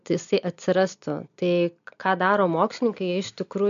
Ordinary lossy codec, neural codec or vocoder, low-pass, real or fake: MP3, 64 kbps; none; 7.2 kHz; real